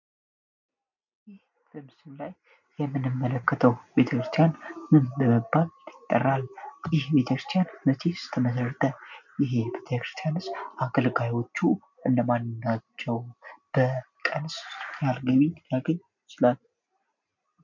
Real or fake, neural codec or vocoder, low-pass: real; none; 7.2 kHz